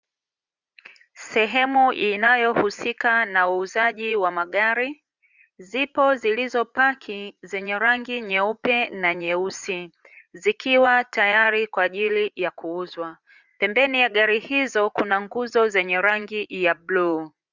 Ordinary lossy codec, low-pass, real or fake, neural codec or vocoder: Opus, 64 kbps; 7.2 kHz; fake; vocoder, 44.1 kHz, 80 mel bands, Vocos